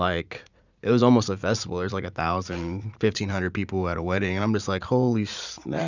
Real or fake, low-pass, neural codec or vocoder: fake; 7.2 kHz; vocoder, 44.1 kHz, 80 mel bands, Vocos